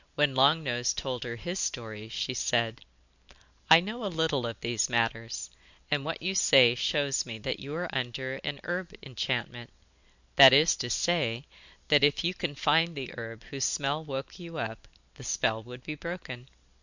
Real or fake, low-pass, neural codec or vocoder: real; 7.2 kHz; none